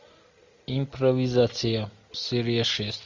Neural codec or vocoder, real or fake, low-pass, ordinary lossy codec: none; real; 7.2 kHz; MP3, 64 kbps